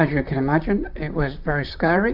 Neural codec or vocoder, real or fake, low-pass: vocoder, 44.1 kHz, 128 mel bands, Pupu-Vocoder; fake; 5.4 kHz